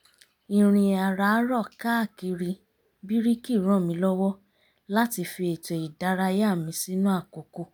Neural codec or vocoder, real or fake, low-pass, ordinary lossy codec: none; real; none; none